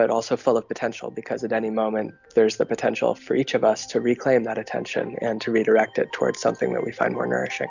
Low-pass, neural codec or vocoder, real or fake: 7.2 kHz; none; real